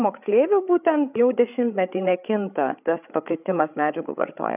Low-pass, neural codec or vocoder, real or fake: 3.6 kHz; codec, 16 kHz, 8 kbps, FreqCodec, larger model; fake